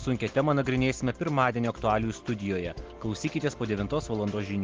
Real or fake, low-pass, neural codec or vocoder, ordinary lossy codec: real; 7.2 kHz; none; Opus, 16 kbps